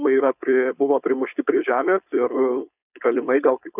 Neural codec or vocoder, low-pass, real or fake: codec, 16 kHz, 4.8 kbps, FACodec; 3.6 kHz; fake